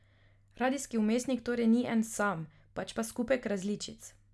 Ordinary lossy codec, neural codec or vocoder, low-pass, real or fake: none; none; none; real